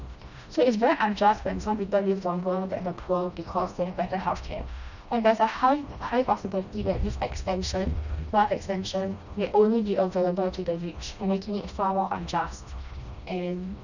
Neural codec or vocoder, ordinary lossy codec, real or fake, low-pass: codec, 16 kHz, 1 kbps, FreqCodec, smaller model; none; fake; 7.2 kHz